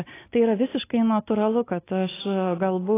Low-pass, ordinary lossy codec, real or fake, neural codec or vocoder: 3.6 kHz; AAC, 16 kbps; real; none